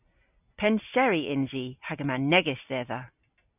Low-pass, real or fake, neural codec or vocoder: 3.6 kHz; real; none